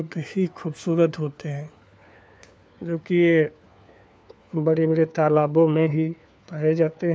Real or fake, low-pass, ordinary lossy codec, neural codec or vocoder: fake; none; none; codec, 16 kHz, 4 kbps, FunCodec, trained on LibriTTS, 50 frames a second